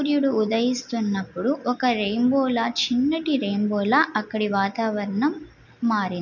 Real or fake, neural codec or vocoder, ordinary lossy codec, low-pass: real; none; none; 7.2 kHz